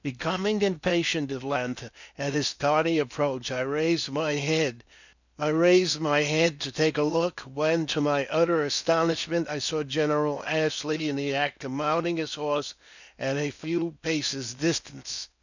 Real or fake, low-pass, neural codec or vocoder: fake; 7.2 kHz; codec, 16 kHz in and 24 kHz out, 0.6 kbps, FocalCodec, streaming, 2048 codes